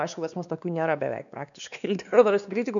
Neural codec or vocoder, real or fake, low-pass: codec, 16 kHz, 2 kbps, X-Codec, WavLM features, trained on Multilingual LibriSpeech; fake; 7.2 kHz